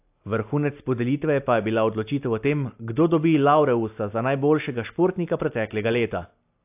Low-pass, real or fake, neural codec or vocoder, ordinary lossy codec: 3.6 kHz; real; none; AAC, 32 kbps